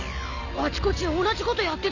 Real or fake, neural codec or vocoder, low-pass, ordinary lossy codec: real; none; 7.2 kHz; none